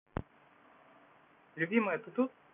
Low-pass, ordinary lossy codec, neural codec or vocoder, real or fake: 3.6 kHz; none; none; real